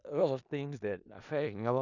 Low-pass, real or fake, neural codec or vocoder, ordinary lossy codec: 7.2 kHz; fake; codec, 16 kHz in and 24 kHz out, 0.4 kbps, LongCat-Audio-Codec, four codebook decoder; none